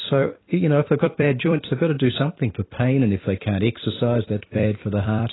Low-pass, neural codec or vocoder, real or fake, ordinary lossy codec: 7.2 kHz; vocoder, 44.1 kHz, 128 mel bands every 256 samples, BigVGAN v2; fake; AAC, 16 kbps